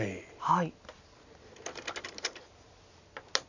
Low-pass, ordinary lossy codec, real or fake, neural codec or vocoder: 7.2 kHz; none; real; none